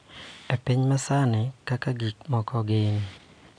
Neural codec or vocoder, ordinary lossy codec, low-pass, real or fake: none; none; 9.9 kHz; real